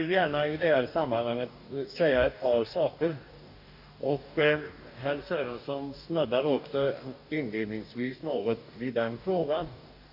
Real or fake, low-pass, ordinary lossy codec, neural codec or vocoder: fake; 5.4 kHz; none; codec, 44.1 kHz, 2.6 kbps, DAC